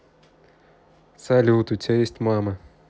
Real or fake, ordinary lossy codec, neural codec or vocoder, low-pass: real; none; none; none